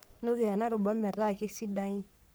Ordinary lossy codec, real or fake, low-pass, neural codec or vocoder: none; fake; none; codec, 44.1 kHz, 3.4 kbps, Pupu-Codec